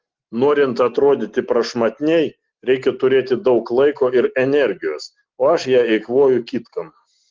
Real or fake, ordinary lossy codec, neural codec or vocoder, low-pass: real; Opus, 16 kbps; none; 7.2 kHz